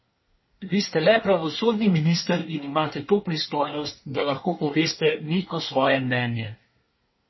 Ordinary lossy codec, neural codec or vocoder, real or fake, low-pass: MP3, 24 kbps; codec, 24 kHz, 1 kbps, SNAC; fake; 7.2 kHz